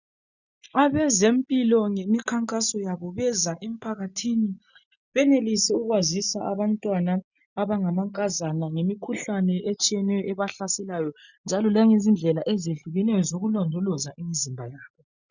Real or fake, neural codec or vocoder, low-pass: real; none; 7.2 kHz